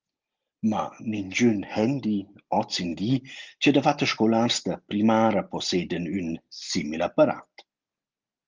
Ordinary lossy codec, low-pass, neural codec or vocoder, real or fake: Opus, 32 kbps; 7.2 kHz; none; real